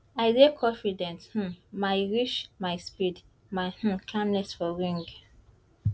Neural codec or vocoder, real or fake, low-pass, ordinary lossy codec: none; real; none; none